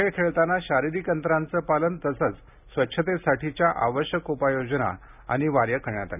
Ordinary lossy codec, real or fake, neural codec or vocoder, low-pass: none; real; none; 3.6 kHz